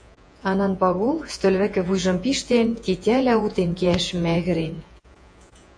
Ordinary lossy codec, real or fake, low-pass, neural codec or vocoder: AAC, 48 kbps; fake; 9.9 kHz; vocoder, 48 kHz, 128 mel bands, Vocos